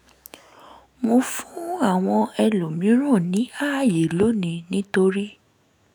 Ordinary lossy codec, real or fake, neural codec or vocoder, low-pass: none; fake; autoencoder, 48 kHz, 128 numbers a frame, DAC-VAE, trained on Japanese speech; none